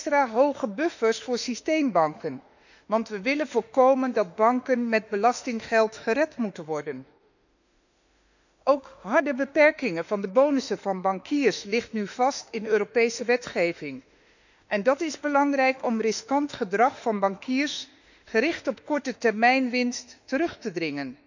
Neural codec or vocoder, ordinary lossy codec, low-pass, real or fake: autoencoder, 48 kHz, 32 numbers a frame, DAC-VAE, trained on Japanese speech; none; 7.2 kHz; fake